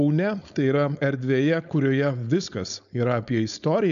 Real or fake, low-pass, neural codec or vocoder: fake; 7.2 kHz; codec, 16 kHz, 4.8 kbps, FACodec